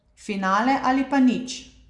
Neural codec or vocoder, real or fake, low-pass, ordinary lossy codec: none; real; 10.8 kHz; Opus, 64 kbps